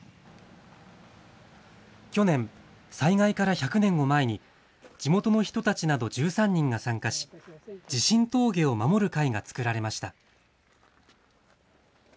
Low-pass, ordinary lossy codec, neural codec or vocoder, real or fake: none; none; none; real